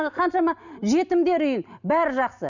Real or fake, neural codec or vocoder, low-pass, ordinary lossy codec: real; none; 7.2 kHz; none